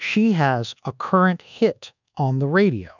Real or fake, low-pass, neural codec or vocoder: fake; 7.2 kHz; codec, 24 kHz, 1.2 kbps, DualCodec